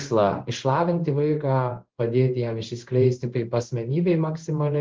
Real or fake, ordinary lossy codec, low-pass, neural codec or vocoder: fake; Opus, 16 kbps; 7.2 kHz; codec, 16 kHz in and 24 kHz out, 1 kbps, XY-Tokenizer